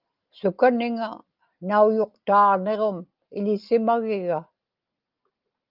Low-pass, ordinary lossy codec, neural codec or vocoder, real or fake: 5.4 kHz; Opus, 24 kbps; none; real